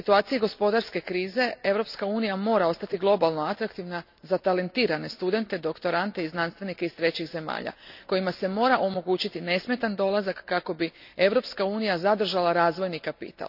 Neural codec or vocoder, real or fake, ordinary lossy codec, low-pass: none; real; none; 5.4 kHz